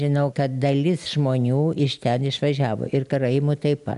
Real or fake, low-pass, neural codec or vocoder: real; 10.8 kHz; none